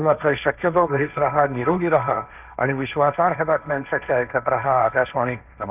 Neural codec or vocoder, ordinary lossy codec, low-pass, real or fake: codec, 16 kHz, 1.1 kbps, Voila-Tokenizer; none; 3.6 kHz; fake